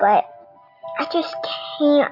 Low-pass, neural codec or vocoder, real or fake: 5.4 kHz; none; real